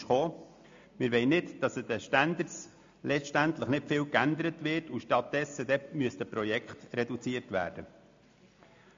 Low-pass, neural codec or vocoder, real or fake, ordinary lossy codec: 7.2 kHz; none; real; MP3, 48 kbps